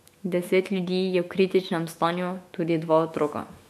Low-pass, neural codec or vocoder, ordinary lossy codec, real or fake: 14.4 kHz; autoencoder, 48 kHz, 128 numbers a frame, DAC-VAE, trained on Japanese speech; MP3, 64 kbps; fake